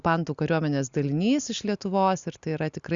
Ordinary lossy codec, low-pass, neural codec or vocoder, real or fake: Opus, 64 kbps; 7.2 kHz; none; real